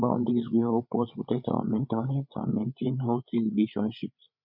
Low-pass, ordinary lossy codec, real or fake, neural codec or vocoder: 5.4 kHz; none; fake; codec, 16 kHz, 8 kbps, FreqCodec, larger model